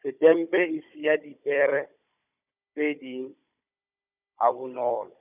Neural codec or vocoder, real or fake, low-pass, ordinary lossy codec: codec, 16 kHz, 16 kbps, FunCodec, trained on Chinese and English, 50 frames a second; fake; 3.6 kHz; none